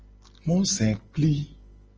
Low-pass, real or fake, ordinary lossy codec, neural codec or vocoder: 7.2 kHz; real; Opus, 24 kbps; none